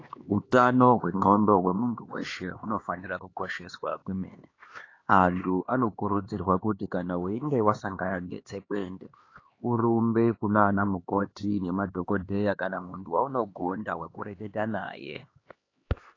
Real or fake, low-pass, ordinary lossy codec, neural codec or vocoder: fake; 7.2 kHz; AAC, 32 kbps; codec, 16 kHz, 2 kbps, X-Codec, HuBERT features, trained on LibriSpeech